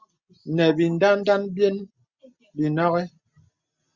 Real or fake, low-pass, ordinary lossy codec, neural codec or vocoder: real; 7.2 kHz; Opus, 64 kbps; none